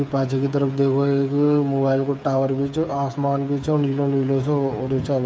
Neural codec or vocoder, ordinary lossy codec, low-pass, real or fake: codec, 16 kHz, 8 kbps, FreqCodec, smaller model; none; none; fake